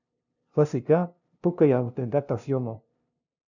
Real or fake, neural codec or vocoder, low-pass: fake; codec, 16 kHz, 0.5 kbps, FunCodec, trained on LibriTTS, 25 frames a second; 7.2 kHz